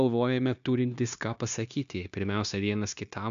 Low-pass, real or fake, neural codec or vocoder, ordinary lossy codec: 7.2 kHz; fake; codec, 16 kHz, 0.9 kbps, LongCat-Audio-Codec; AAC, 96 kbps